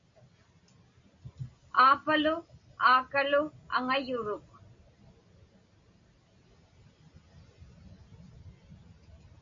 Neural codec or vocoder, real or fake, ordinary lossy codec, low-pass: none; real; MP3, 48 kbps; 7.2 kHz